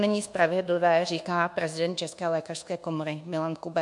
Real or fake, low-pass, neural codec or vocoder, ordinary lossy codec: fake; 10.8 kHz; codec, 24 kHz, 1.2 kbps, DualCodec; MP3, 64 kbps